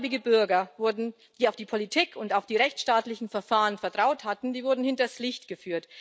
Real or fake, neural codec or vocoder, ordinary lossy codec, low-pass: real; none; none; none